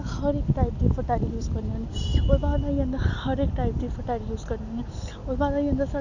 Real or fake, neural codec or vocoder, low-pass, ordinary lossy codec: real; none; 7.2 kHz; none